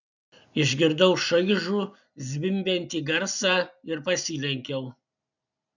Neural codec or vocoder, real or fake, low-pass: none; real; 7.2 kHz